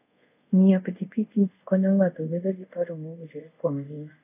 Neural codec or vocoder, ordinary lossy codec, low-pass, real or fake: codec, 24 kHz, 0.5 kbps, DualCodec; AAC, 32 kbps; 3.6 kHz; fake